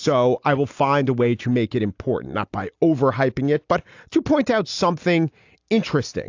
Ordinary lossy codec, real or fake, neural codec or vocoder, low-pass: AAC, 48 kbps; real; none; 7.2 kHz